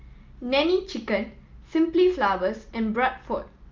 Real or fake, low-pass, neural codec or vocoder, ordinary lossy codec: real; 7.2 kHz; none; Opus, 24 kbps